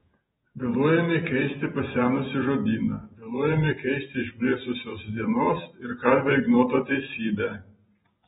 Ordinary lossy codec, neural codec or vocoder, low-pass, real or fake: AAC, 16 kbps; vocoder, 48 kHz, 128 mel bands, Vocos; 19.8 kHz; fake